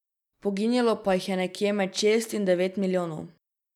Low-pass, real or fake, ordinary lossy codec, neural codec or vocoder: 19.8 kHz; real; none; none